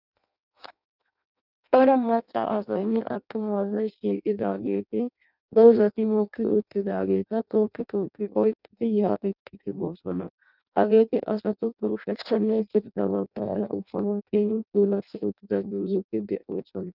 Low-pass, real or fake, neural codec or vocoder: 5.4 kHz; fake; codec, 16 kHz in and 24 kHz out, 0.6 kbps, FireRedTTS-2 codec